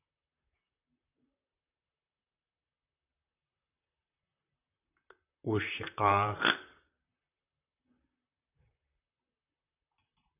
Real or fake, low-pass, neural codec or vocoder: fake; 3.6 kHz; vocoder, 44.1 kHz, 128 mel bands, Pupu-Vocoder